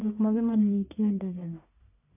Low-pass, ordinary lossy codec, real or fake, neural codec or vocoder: 3.6 kHz; none; fake; codec, 44.1 kHz, 1.7 kbps, Pupu-Codec